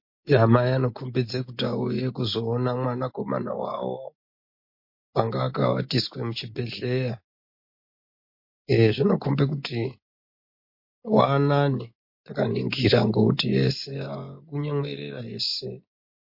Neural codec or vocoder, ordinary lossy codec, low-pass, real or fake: none; MP3, 32 kbps; 5.4 kHz; real